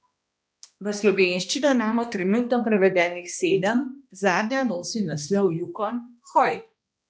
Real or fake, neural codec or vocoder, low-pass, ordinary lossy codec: fake; codec, 16 kHz, 1 kbps, X-Codec, HuBERT features, trained on balanced general audio; none; none